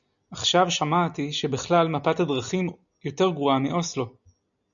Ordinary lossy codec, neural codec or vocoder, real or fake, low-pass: MP3, 96 kbps; none; real; 7.2 kHz